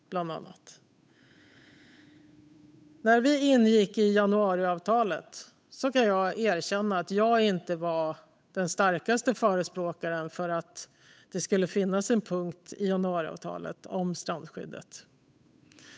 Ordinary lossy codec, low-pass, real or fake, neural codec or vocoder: none; none; fake; codec, 16 kHz, 8 kbps, FunCodec, trained on Chinese and English, 25 frames a second